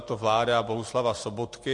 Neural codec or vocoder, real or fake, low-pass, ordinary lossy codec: none; real; 10.8 kHz; MP3, 48 kbps